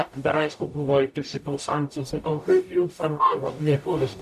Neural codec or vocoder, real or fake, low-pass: codec, 44.1 kHz, 0.9 kbps, DAC; fake; 14.4 kHz